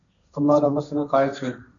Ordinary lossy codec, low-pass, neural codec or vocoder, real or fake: MP3, 96 kbps; 7.2 kHz; codec, 16 kHz, 1.1 kbps, Voila-Tokenizer; fake